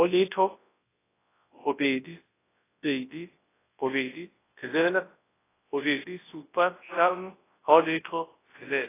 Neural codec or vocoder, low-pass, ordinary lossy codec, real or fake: codec, 24 kHz, 0.9 kbps, WavTokenizer, large speech release; 3.6 kHz; AAC, 16 kbps; fake